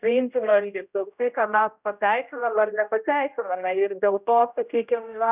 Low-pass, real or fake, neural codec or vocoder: 3.6 kHz; fake; codec, 16 kHz, 0.5 kbps, X-Codec, HuBERT features, trained on general audio